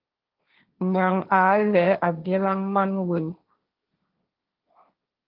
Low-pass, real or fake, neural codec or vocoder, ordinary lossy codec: 5.4 kHz; fake; codec, 16 kHz, 1.1 kbps, Voila-Tokenizer; Opus, 32 kbps